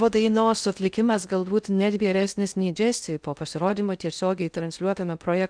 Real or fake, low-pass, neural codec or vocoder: fake; 9.9 kHz; codec, 16 kHz in and 24 kHz out, 0.6 kbps, FocalCodec, streaming, 2048 codes